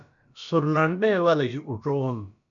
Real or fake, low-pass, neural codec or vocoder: fake; 7.2 kHz; codec, 16 kHz, about 1 kbps, DyCAST, with the encoder's durations